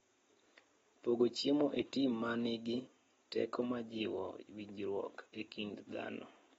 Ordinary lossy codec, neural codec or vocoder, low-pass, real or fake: AAC, 24 kbps; none; 19.8 kHz; real